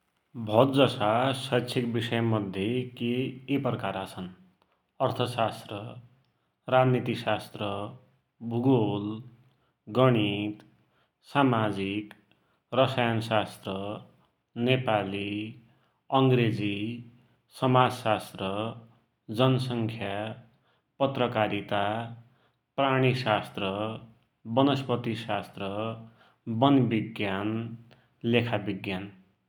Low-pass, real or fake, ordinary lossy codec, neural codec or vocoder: 19.8 kHz; real; none; none